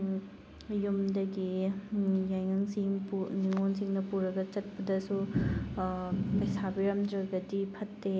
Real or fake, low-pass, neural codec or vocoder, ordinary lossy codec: real; none; none; none